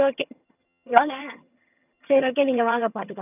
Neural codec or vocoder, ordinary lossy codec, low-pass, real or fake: vocoder, 22.05 kHz, 80 mel bands, HiFi-GAN; none; 3.6 kHz; fake